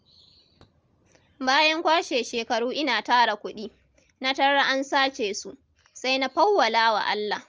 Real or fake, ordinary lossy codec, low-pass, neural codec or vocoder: real; Opus, 24 kbps; 7.2 kHz; none